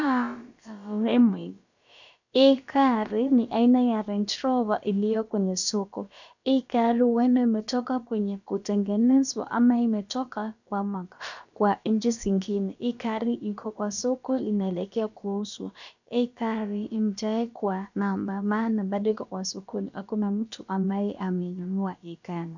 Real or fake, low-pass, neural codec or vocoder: fake; 7.2 kHz; codec, 16 kHz, about 1 kbps, DyCAST, with the encoder's durations